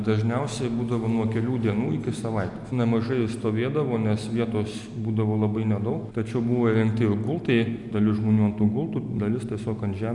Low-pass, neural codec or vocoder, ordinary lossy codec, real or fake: 10.8 kHz; none; MP3, 96 kbps; real